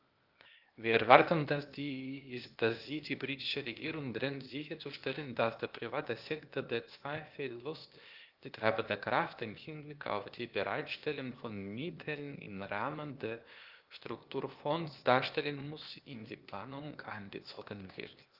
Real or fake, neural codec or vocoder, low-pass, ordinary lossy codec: fake; codec, 16 kHz, 0.8 kbps, ZipCodec; 5.4 kHz; Opus, 32 kbps